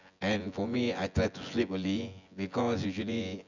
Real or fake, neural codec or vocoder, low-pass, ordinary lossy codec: fake; vocoder, 24 kHz, 100 mel bands, Vocos; 7.2 kHz; none